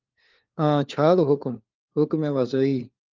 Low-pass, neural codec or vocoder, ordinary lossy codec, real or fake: 7.2 kHz; codec, 16 kHz, 4 kbps, FunCodec, trained on LibriTTS, 50 frames a second; Opus, 32 kbps; fake